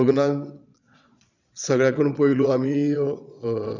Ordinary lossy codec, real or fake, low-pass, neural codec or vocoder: none; fake; 7.2 kHz; vocoder, 22.05 kHz, 80 mel bands, Vocos